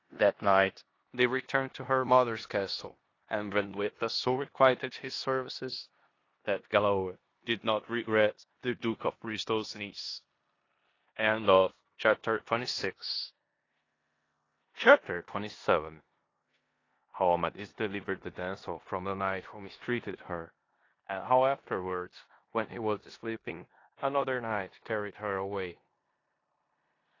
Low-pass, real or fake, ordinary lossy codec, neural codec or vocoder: 7.2 kHz; fake; AAC, 32 kbps; codec, 16 kHz in and 24 kHz out, 0.9 kbps, LongCat-Audio-Codec, four codebook decoder